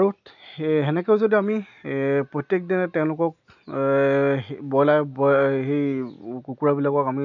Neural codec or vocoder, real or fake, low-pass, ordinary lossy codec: none; real; 7.2 kHz; none